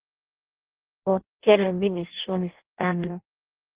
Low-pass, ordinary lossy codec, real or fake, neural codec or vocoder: 3.6 kHz; Opus, 16 kbps; fake; codec, 16 kHz in and 24 kHz out, 0.6 kbps, FireRedTTS-2 codec